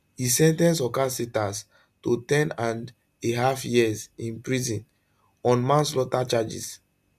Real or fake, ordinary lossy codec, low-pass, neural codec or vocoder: real; none; 14.4 kHz; none